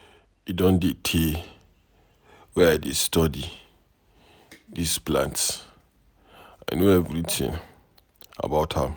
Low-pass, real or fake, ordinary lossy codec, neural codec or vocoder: none; real; none; none